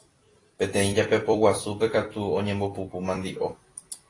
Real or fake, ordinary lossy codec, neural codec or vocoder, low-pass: fake; AAC, 32 kbps; vocoder, 44.1 kHz, 128 mel bands every 256 samples, BigVGAN v2; 10.8 kHz